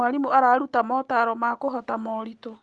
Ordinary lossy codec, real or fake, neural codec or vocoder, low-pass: Opus, 24 kbps; real; none; 10.8 kHz